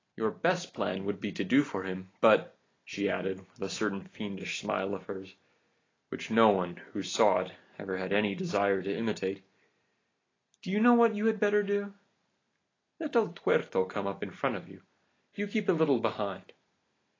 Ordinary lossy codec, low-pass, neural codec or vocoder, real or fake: AAC, 32 kbps; 7.2 kHz; none; real